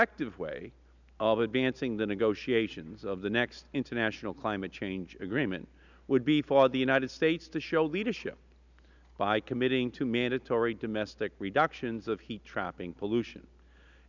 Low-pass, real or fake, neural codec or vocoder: 7.2 kHz; real; none